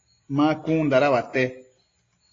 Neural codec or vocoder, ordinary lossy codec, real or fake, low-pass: none; AAC, 32 kbps; real; 7.2 kHz